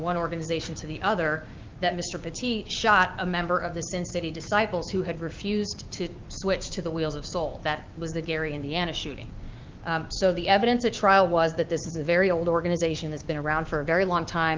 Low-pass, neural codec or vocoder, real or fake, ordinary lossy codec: 7.2 kHz; codec, 16 kHz, 6 kbps, DAC; fake; Opus, 32 kbps